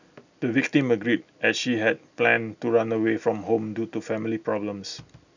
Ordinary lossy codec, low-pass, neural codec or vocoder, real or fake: none; 7.2 kHz; none; real